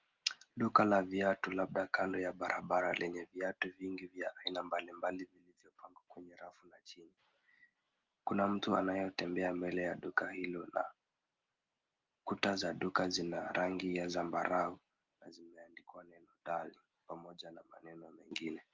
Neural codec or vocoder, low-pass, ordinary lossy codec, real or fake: none; 7.2 kHz; Opus, 32 kbps; real